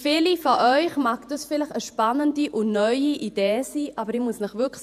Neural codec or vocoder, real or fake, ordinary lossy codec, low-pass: vocoder, 48 kHz, 128 mel bands, Vocos; fake; none; 14.4 kHz